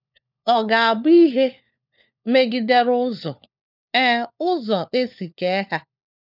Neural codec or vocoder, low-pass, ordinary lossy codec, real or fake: codec, 16 kHz, 4 kbps, FunCodec, trained on LibriTTS, 50 frames a second; 5.4 kHz; none; fake